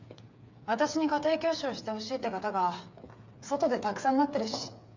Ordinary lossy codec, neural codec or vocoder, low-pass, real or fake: MP3, 64 kbps; codec, 16 kHz, 8 kbps, FreqCodec, smaller model; 7.2 kHz; fake